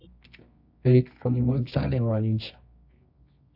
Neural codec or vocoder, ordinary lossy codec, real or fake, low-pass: codec, 24 kHz, 0.9 kbps, WavTokenizer, medium music audio release; AAC, 48 kbps; fake; 5.4 kHz